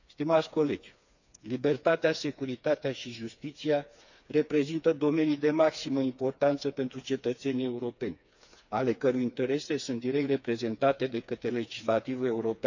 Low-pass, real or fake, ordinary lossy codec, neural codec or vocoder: 7.2 kHz; fake; none; codec, 16 kHz, 4 kbps, FreqCodec, smaller model